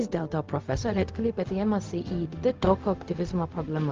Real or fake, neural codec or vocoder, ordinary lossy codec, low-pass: fake; codec, 16 kHz, 0.4 kbps, LongCat-Audio-Codec; Opus, 32 kbps; 7.2 kHz